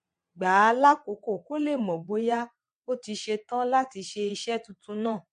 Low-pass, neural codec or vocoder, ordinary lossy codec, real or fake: 9.9 kHz; vocoder, 22.05 kHz, 80 mel bands, WaveNeXt; MP3, 48 kbps; fake